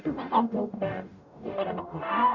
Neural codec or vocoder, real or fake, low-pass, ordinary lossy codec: codec, 44.1 kHz, 0.9 kbps, DAC; fake; 7.2 kHz; none